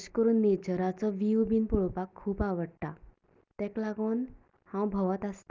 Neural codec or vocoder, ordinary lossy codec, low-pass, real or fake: none; Opus, 24 kbps; 7.2 kHz; real